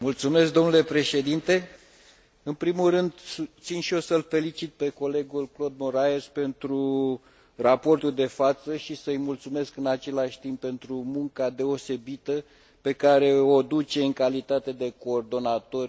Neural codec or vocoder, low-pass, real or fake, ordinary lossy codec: none; none; real; none